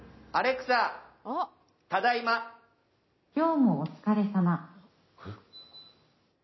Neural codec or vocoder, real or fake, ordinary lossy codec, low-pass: none; real; MP3, 24 kbps; 7.2 kHz